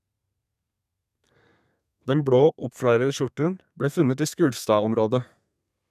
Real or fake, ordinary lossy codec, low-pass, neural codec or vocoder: fake; none; 14.4 kHz; codec, 32 kHz, 1.9 kbps, SNAC